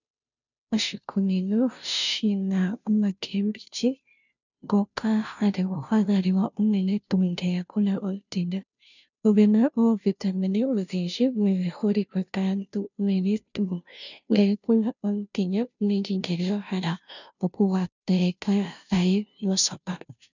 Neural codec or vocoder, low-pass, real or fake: codec, 16 kHz, 0.5 kbps, FunCodec, trained on Chinese and English, 25 frames a second; 7.2 kHz; fake